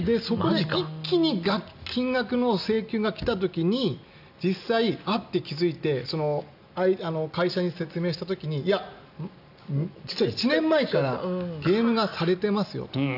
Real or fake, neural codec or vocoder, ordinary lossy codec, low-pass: real; none; none; 5.4 kHz